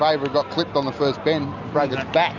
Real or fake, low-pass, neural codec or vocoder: real; 7.2 kHz; none